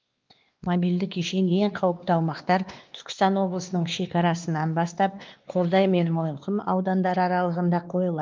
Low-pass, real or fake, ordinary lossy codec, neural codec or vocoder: 7.2 kHz; fake; Opus, 32 kbps; codec, 16 kHz, 2 kbps, X-Codec, WavLM features, trained on Multilingual LibriSpeech